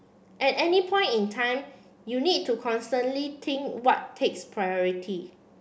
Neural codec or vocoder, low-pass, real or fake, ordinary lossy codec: none; none; real; none